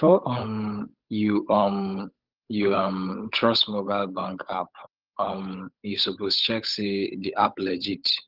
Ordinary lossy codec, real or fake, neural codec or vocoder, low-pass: Opus, 24 kbps; fake; codec, 16 kHz, 8 kbps, FunCodec, trained on Chinese and English, 25 frames a second; 5.4 kHz